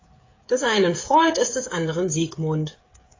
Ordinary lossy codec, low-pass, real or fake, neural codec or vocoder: AAC, 32 kbps; 7.2 kHz; fake; codec, 16 kHz, 16 kbps, FreqCodec, smaller model